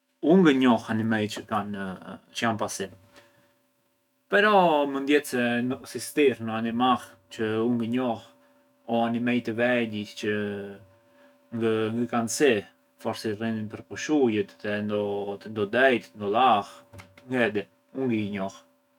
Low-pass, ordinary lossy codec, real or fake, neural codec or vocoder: 19.8 kHz; none; fake; autoencoder, 48 kHz, 128 numbers a frame, DAC-VAE, trained on Japanese speech